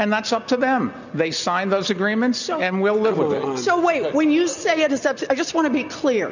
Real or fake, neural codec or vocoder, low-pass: real; none; 7.2 kHz